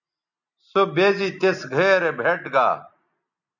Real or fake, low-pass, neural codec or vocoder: real; 7.2 kHz; none